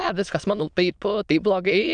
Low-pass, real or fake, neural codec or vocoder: 9.9 kHz; fake; autoencoder, 22.05 kHz, a latent of 192 numbers a frame, VITS, trained on many speakers